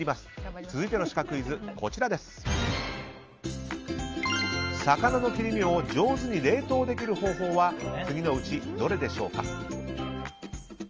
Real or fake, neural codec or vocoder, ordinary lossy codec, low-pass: real; none; Opus, 24 kbps; 7.2 kHz